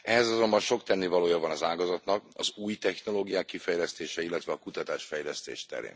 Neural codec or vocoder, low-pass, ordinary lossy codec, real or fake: none; none; none; real